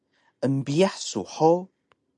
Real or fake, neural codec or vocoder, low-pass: real; none; 10.8 kHz